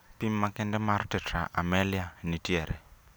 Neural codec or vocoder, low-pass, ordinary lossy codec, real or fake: none; none; none; real